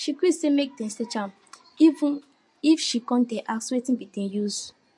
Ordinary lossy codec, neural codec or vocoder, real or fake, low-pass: MP3, 48 kbps; vocoder, 24 kHz, 100 mel bands, Vocos; fake; 10.8 kHz